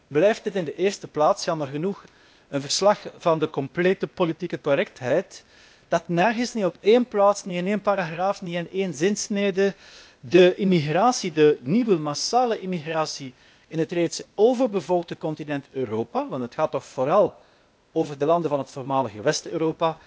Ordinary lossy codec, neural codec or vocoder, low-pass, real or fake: none; codec, 16 kHz, 0.8 kbps, ZipCodec; none; fake